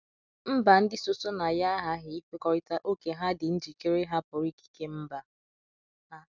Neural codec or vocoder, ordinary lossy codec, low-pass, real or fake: none; none; 7.2 kHz; real